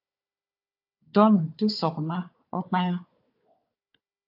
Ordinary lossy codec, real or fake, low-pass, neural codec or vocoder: AAC, 48 kbps; fake; 5.4 kHz; codec, 16 kHz, 4 kbps, FunCodec, trained on Chinese and English, 50 frames a second